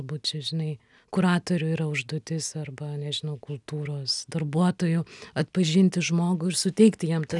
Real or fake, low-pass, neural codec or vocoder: real; 10.8 kHz; none